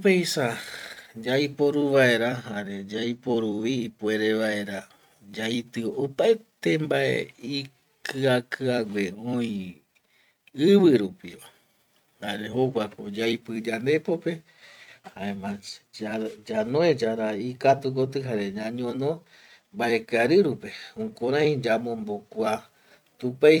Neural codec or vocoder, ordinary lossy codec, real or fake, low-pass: vocoder, 44.1 kHz, 128 mel bands every 256 samples, BigVGAN v2; none; fake; 19.8 kHz